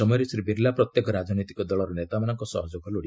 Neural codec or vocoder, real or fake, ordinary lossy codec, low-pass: none; real; none; none